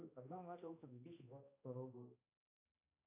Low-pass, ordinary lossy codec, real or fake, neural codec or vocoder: 3.6 kHz; AAC, 32 kbps; fake; codec, 16 kHz, 1 kbps, X-Codec, HuBERT features, trained on balanced general audio